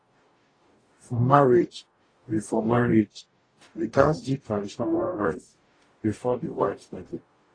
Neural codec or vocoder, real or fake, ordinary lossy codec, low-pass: codec, 44.1 kHz, 0.9 kbps, DAC; fake; AAC, 32 kbps; 9.9 kHz